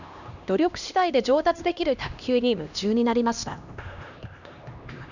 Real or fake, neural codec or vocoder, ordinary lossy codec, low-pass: fake; codec, 16 kHz, 1 kbps, X-Codec, HuBERT features, trained on LibriSpeech; none; 7.2 kHz